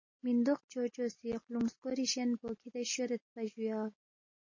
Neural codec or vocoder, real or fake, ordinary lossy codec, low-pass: none; real; MP3, 32 kbps; 7.2 kHz